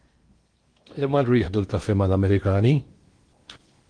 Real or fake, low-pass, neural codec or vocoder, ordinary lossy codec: fake; 9.9 kHz; codec, 16 kHz in and 24 kHz out, 0.8 kbps, FocalCodec, streaming, 65536 codes; Opus, 24 kbps